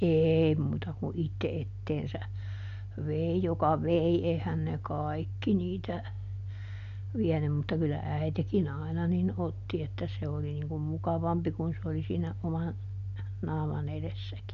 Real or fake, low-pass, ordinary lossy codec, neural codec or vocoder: real; 7.2 kHz; MP3, 64 kbps; none